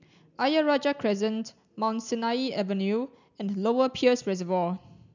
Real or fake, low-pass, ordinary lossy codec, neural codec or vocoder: real; 7.2 kHz; none; none